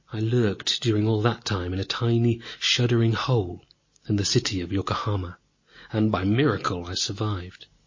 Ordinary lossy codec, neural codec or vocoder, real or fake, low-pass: MP3, 32 kbps; none; real; 7.2 kHz